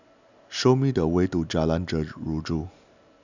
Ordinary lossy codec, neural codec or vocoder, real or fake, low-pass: none; none; real; 7.2 kHz